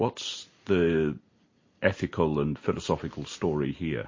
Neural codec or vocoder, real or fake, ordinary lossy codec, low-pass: none; real; MP3, 32 kbps; 7.2 kHz